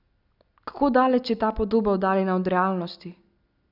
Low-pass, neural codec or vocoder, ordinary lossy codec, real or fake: 5.4 kHz; none; none; real